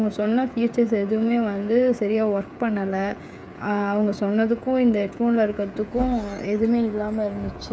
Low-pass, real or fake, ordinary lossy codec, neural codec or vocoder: none; fake; none; codec, 16 kHz, 16 kbps, FreqCodec, smaller model